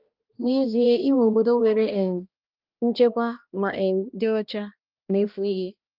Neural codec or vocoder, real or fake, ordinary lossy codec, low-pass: codec, 16 kHz, 1 kbps, X-Codec, HuBERT features, trained on balanced general audio; fake; Opus, 32 kbps; 5.4 kHz